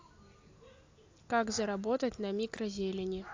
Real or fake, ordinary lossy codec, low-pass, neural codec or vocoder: real; AAC, 48 kbps; 7.2 kHz; none